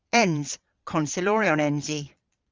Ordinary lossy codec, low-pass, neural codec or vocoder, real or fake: Opus, 32 kbps; 7.2 kHz; vocoder, 22.05 kHz, 80 mel bands, Vocos; fake